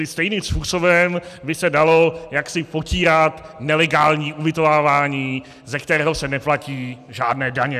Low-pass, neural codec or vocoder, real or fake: 14.4 kHz; none; real